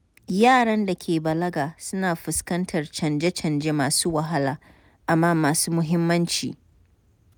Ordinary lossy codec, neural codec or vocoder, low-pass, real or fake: none; none; none; real